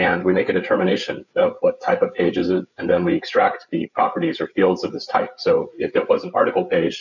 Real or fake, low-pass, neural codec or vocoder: fake; 7.2 kHz; codec, 16 kHz, 8 kbps, FreqCodec, larger model